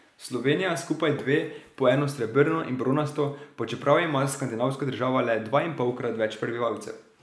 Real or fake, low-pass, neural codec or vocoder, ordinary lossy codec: real; none; none; none